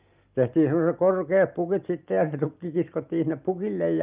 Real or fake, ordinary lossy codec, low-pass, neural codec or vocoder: real; Opus, 24 kbps; 3.6 kHz; none